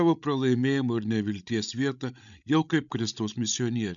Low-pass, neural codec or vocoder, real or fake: 7.2 kHz; codec, 16 kHz, 16 kbps, FreqCodec, larger model; fake